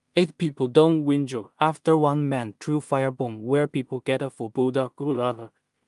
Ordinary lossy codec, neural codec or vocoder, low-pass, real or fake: Opus, 32 kbps; codec, 16 kHz in and 24 kHz out, 0.4 kbps, LongCat-Audio-Codec, two codebook decoder; 10.8 kHz; fake